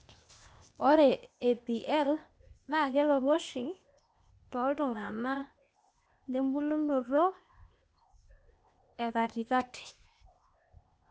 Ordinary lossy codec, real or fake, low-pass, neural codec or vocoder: none; fake; none; codec, 16 kHz, 0.8 kbps, ZipCodec